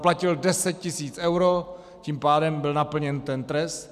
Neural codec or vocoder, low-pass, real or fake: none; 14.4 kHz; real